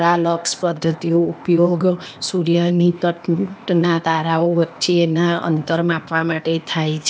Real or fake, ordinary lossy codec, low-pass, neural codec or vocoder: fake; none; none; codec, 16 kHz, 0.8 kbps, ZipCodec